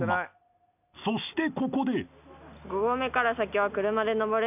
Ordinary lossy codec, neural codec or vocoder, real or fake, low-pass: none; none; real; 3.6 kHz